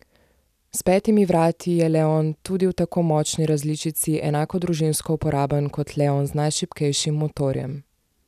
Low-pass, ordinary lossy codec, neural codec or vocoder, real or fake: 14.4 kHz; none; none; real